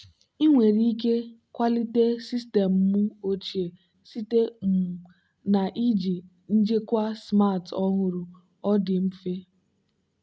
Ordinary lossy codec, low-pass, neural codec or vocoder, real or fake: none; none; none; real